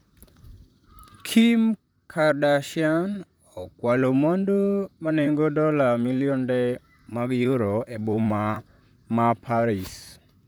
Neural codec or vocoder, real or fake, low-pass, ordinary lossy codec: vocoder, 44.1 kHz, 128 mel bands, Pupu-Vocoder; fake; none; none